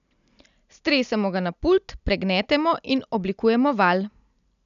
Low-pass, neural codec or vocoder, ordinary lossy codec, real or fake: 7.2 kHz; none; none; real